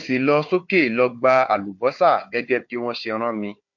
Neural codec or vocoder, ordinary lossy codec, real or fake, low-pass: codec, 16 kHz, 4 kbps, FunCodec, trained on Chinese and English, 50 frames a second; MP3, 48 kbps; fake; 7.2 kHz